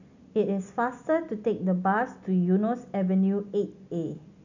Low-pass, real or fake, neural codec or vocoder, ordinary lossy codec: 7.2 kHz; real; none; none